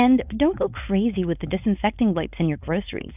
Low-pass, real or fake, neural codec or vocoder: 3.6 kHz; fake; codec, 16 kHz, 4.8 kbps, FACodec